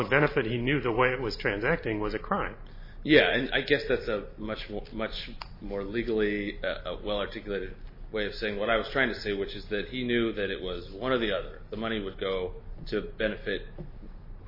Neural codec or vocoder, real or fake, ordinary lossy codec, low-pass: none; real; MP3, 24 kbps; 5.4 kHz